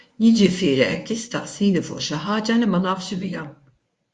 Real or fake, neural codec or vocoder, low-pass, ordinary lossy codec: fake; codec, 24 kHz, 0.9 kbps, WavTokenizer, medium speech release version 1; 10.8 kHz; Opus, 64 kbps